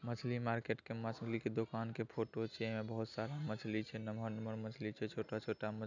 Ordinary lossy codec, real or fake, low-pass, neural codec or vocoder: none; real; 7.2 kHz; none